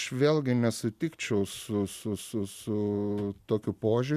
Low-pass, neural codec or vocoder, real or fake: 14.4 kHz; none; real